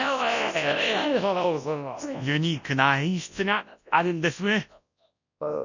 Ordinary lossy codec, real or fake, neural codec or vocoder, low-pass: none; fake; codec, 24 kHz, 0.9 kbps, WavTokenizer, large speech release; 7.2 kHz